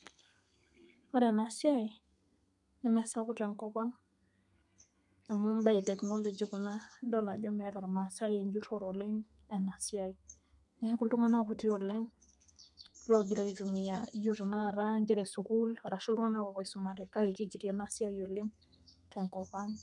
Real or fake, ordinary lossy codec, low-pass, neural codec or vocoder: fake; none; 10.8 kHz; codec, 32 kHz, 1.9 kbps, SNAC